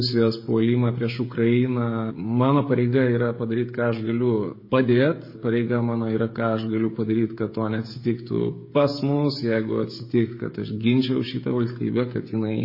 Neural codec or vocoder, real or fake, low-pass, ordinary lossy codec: codec, 16 kHz, 16 kbps, FreqCodec, smaller model; fake; 5.4 kHz; MP3, 24 kbps